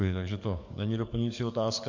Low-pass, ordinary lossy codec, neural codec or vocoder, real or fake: 7.2 kHz; MP3, 48 kbps; codec, 44.1 kHz, 7.8 kbps, DAC; fake